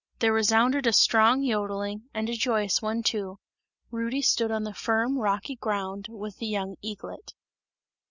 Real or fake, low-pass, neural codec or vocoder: real; 7.2 kHz; none